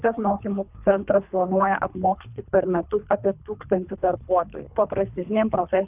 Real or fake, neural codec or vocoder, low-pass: fake; codec, 24 kHz, 3 kbps, HILCodec; 3.6 kHz